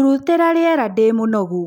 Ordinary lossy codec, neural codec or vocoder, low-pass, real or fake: none; none; 19.8 kHz; real